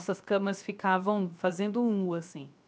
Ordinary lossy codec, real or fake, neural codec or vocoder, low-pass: none; fake; codec, 16 kHz, 0.7 kbps, FocalCodec; none